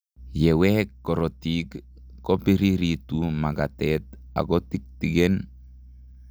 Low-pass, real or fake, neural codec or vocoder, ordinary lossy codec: none; real; none; none